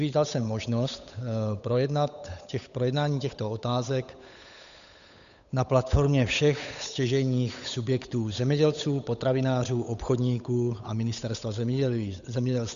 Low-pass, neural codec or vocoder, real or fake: 7.2 kHz; codec, 16 kHz, 8 kbps, FunCodec, trained on Chinese and English, 25 frames a second; fake